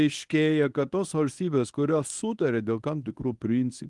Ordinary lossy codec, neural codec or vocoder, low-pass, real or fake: Opus, 24 kbps; codec, 24 kHz, 0.9 kbps, WavTokenizer, medium speech release version 1; 10.8 kHz; fake